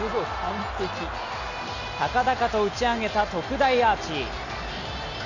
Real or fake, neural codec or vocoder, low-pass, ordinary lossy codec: real; none; 7.2 kHz; none